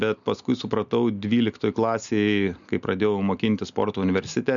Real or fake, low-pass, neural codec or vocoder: real; 7.2 kHz; none